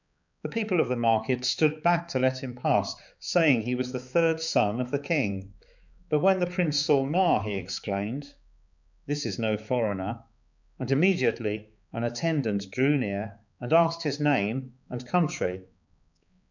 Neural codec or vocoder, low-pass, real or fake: codec, 16 kHz, 4 kbps, X-Codec, HuBERT features, trained on balanced general audio; 7.2 kHz; fake